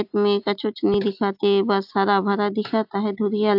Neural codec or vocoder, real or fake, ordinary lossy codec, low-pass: none; real; AAC, 48 kbps; 5.4 kHz